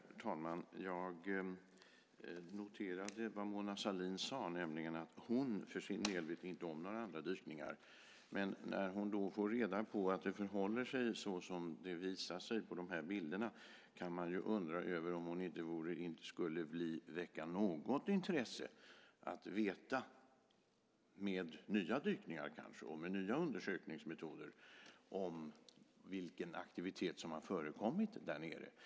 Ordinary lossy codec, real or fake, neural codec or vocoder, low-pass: none; real; none; none